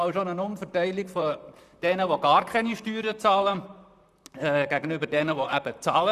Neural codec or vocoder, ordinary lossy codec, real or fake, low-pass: vocoder, 44.1 kHz, 128 mel bands, Pupu-Vocoder; none; fake; 14.4 kHz